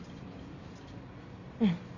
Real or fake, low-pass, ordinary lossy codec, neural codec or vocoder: real; 7.2 kHz; none; none